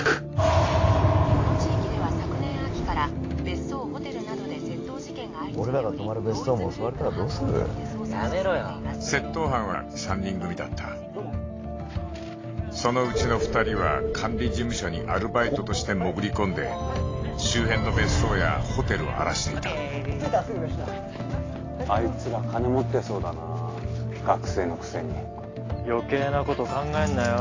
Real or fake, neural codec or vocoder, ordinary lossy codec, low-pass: real; none; AAC, 32 kbps; 7.2 kHz